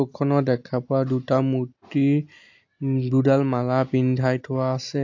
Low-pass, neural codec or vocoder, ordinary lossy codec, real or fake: 7.2 kHz; none; AAC, 48 kbps; real